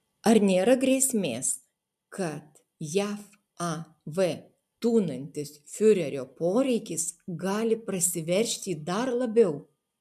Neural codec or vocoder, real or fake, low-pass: none; real; 14.4 kHz